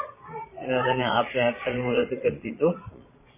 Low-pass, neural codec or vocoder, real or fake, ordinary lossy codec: 3.6 kHz; vocoder, 22.05 kHz, 80 mel bands, Vocos; fake; MP3, 16 kbps